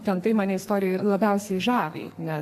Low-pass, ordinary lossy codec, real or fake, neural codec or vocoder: 14.4 kHz; AAC, 64 kbps; fake; codec, 44.1 kHz, 2.6 kbps, SNAC